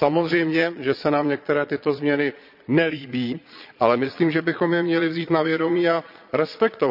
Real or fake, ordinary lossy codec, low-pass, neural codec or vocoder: fake; none; 5.4 kHz; vocoder, 22.05 kHz, 80 mel bands, Vocos